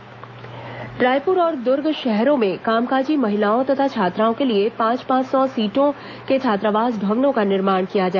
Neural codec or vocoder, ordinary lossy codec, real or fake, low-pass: autoencoder, 48 kHz, 128 numbers a frame, DAC-VAE, trained on Japanese speech; none; fake; 7.2 kHz